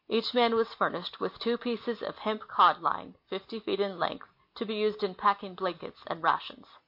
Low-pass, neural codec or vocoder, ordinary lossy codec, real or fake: 5.4 kHz; none; MP3, 32 kbps; real